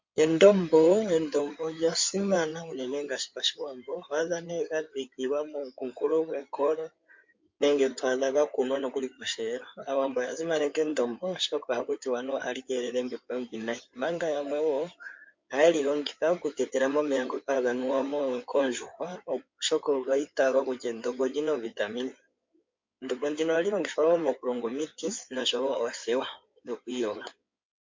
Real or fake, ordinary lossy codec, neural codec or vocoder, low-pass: fake; MP3, 48 kbps; codec, 16 kHz in and 24 kHz out, 2.2 kbps, FireRedTTS-2 codec; 7.2 kHz